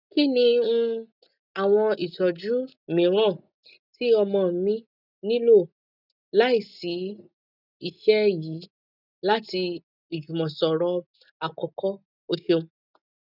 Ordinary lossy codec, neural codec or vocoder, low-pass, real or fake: none; none; 5.4 kHz; real